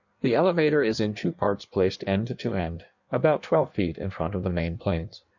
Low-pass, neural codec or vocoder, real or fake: 7.2 kHz; codec, 16 kHz in and 24 kHz out, 1.1 kbps, FireRedTTS-2 codec; fake